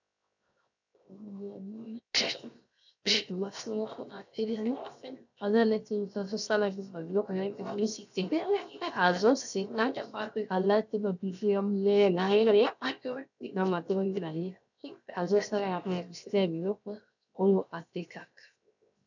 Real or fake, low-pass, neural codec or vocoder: fake; 7.2 kHz; codec, 16 kHz, 0.7 kbps, FocalCodec